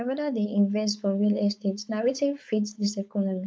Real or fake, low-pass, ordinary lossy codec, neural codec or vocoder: fake; none; none; codec, 16 kHz, 4.8 kbps, FACodec